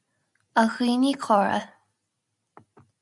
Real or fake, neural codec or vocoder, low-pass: real; none; 10.8 kHz